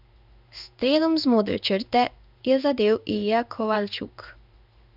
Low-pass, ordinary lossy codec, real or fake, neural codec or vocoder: 5.4 kHz; none; fake; codec, 16 kHz in and 24 kHz out, 1 kbps, XY-Tokenizer